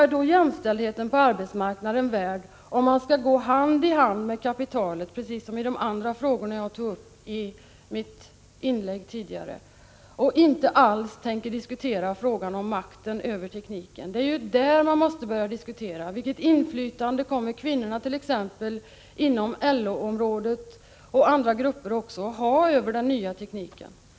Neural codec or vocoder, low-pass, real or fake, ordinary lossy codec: none; none; real; none